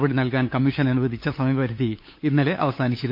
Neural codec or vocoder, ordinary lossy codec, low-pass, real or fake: codec, 16 kHz, 8 kbps, FunCodec, trained on LibriTTS, 25 frames a second; MP3, 32 kbps; 5.4 kHz; fake